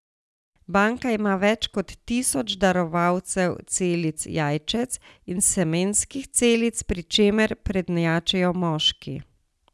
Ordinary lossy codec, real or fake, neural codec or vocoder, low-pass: none; real; none; none